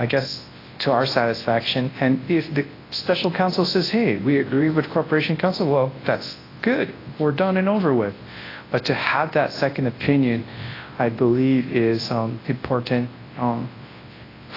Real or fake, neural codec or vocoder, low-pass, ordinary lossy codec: fake; codec, 24 kHz, 0.9 kbps, WavTokenizer, large speech release; 5.4 kHz; AAC, 24 kbps